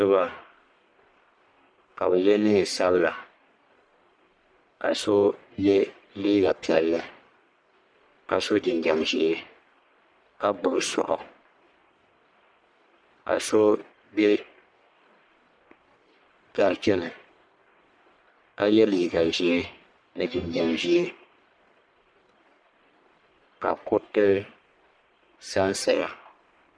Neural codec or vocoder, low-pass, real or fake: codec, 44.1 kHz, 1.7 kbps, Pupu-Codec; 9.9 kHz; fake